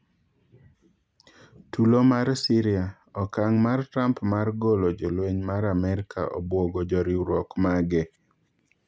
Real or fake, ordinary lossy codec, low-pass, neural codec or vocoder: real; none; none; none